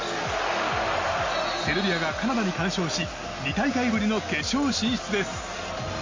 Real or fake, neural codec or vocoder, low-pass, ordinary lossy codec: real; none; 7.2 kHz; MP3, 32 kbps